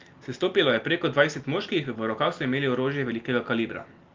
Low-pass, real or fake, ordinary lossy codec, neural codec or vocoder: 7.2 kHz; real; Opus, 32 kbps; none